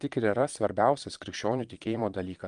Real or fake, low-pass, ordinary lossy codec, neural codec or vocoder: fake; 9.9 kHz; Opus, 32 kbps; vocoder, 22.05 kHz, 80 mel bands, Vocos